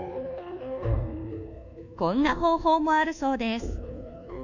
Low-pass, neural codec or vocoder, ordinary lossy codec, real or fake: 7.2 kHz; codec, 24 kHz, 1.2 kbps, DualCodec; none; fake